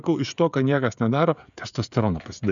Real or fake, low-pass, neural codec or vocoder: fake; 7.2 kHz; codec, 16 kHz, 16 kbps, FreqCodec, smaller model